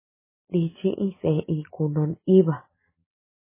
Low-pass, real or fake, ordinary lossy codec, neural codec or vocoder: 3.6 kHz; real; MP3, 16 kbps; none